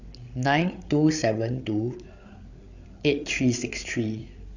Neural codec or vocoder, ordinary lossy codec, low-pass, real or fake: codec, 16 kHz, 8 kbps, FreqCodec, larger model; none; 7.2 kHz; fake